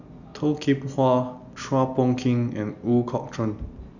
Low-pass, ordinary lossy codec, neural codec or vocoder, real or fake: 7.2 kHz; none; none; real